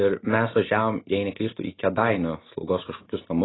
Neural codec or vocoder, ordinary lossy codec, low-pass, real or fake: none; AAC, 16 kbps; 7.2 kHz; real